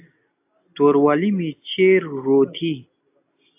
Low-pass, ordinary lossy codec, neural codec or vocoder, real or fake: 3.6 kHz; AAC, 32 kbps; none; real